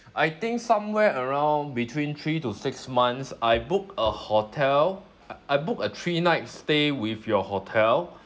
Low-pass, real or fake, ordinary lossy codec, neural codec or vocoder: none; real; none; none